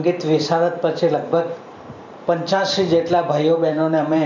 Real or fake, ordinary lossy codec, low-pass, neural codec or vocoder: fake; none; 7.2 kHz; vocoder, 44.1 kHz, 128 mel bands every 512 samples, BigVGAN v2